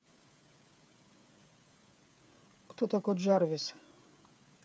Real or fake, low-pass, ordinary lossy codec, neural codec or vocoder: fake; none; none; codec, 16 kHz, 16 kbps, FreqCodec, smaller model